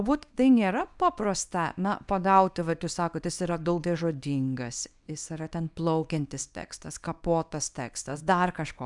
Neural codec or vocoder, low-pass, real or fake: codec, 24 kHz, 0.9 kbps, WavTokenizer, small release; 10.8 kHz; fake